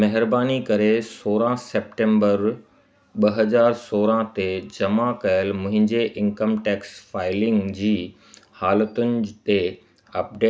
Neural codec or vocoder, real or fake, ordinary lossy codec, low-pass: none; real; none; none